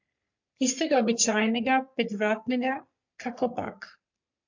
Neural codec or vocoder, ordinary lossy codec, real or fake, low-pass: codec, 44.1 kHz, 3.4 kbps, Pupu-Codec; MP3, 48 kbps; fake; 7.2 kHz